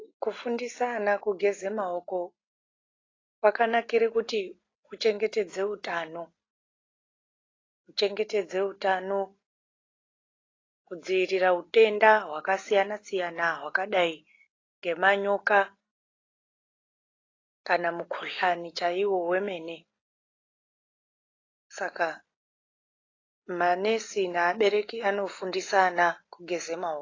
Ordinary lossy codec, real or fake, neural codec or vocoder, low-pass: AAC, 32 kbps; real; none; 7.2 kHz